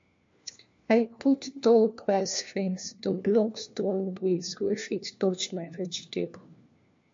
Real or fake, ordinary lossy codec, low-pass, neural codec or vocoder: fake; MP3, 48 kbps; 7.2 kHz; codec, 16 kHz, 1 kbps, FunCodec, trained on LibriTTS, 50 frames a second